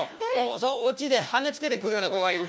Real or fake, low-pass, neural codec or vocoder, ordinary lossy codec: fake; none; codec, 16 kHz, 1 kbps, FunCodec, trained on LibriTTS, 50 frames a second; none